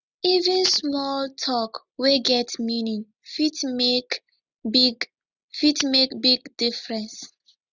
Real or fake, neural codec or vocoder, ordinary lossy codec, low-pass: real; none; none; 7.2 kHz